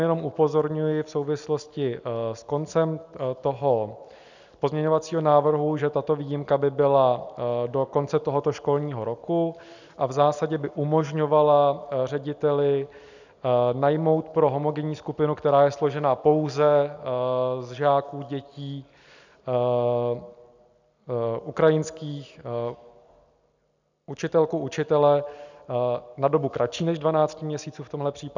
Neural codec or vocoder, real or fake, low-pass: none; real; 7.2 kHz